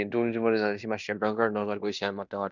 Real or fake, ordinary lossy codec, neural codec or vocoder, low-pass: fake; none; codec, 16 kHz in and 24 kHz out, 0.9 kbps, LongCat-Audio-Codec, fine tuned four codebook decoder; 7.2 kHz